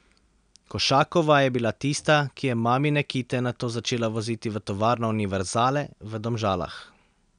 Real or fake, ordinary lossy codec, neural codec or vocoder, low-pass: real; none; none; 9.9 kHz